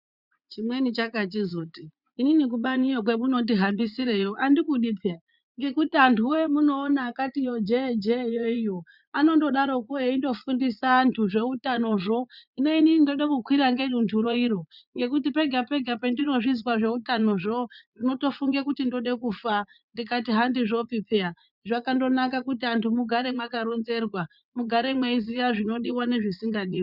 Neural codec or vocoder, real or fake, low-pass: vocoder, 44.1 kHz, 80 mel bands, Vocos; fake; 5.4 kHz